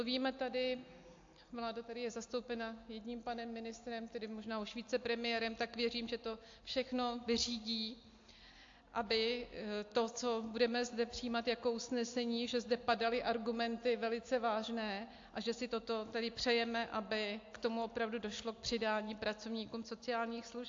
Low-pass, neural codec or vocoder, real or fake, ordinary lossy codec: 7.2 kHz; none; real; AAC, 48 kbps